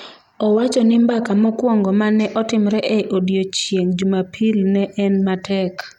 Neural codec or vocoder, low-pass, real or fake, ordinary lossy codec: none; 19.8 kHz; real; none